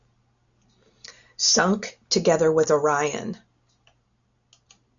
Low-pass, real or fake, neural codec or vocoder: 7.2 kHz; real; none